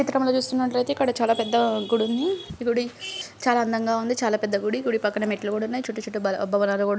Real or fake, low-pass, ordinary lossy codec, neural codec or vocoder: real; none; none; none